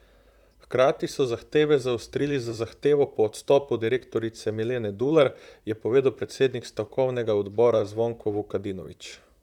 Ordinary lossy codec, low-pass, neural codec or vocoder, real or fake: none; 19.8 kHz; vocoder, 44.1 kHz, 128 mel bands, Pupu-Vocoder; fake